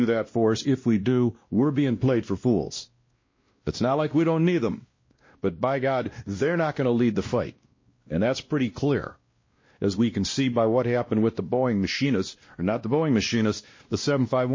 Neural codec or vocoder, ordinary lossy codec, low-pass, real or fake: codec, 16 kHz, 1 kbps, X-Codec, WavLM features, trained on Multilingual LibriSpeech; MP3, 32 kbps; 7.2 kHz; fake